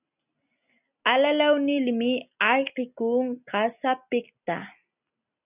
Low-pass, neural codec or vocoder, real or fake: 3.6 kHz; none; real